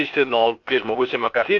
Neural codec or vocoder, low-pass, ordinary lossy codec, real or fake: codec, 16 kHz, 0.8 kbps, ZipCodec; 7.2 kHz; MP3, 48 kbps; fake